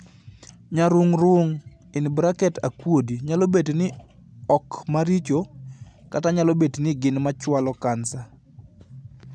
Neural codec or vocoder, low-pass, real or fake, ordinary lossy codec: none; none; real; none